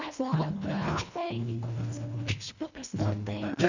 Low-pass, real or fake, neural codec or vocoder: 7.2 kHz; fake; codec, 24 kHz, 1.5 kbps, HILCodec